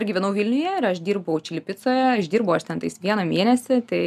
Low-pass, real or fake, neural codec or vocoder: 14.4 kHz; real; none